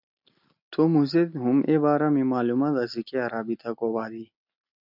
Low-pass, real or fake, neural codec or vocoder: 5.4 kHz; real; none